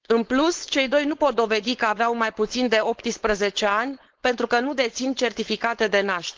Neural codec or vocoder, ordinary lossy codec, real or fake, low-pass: codec, 16 kHz, 4.8 kbps, FACodec; Opus, 16 kbps; fake; 7.2 kHz